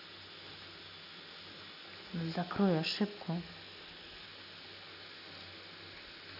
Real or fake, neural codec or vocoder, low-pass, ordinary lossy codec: real; none; 5.4 kHz; none